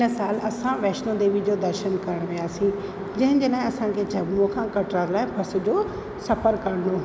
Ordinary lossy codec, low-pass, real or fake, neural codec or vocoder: none; none; real; none